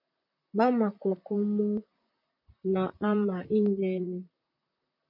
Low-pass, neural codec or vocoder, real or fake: 5.4 kHz; vocoder, 44.1 kHz, 128 mel bands, Pupu-Vocoder; fake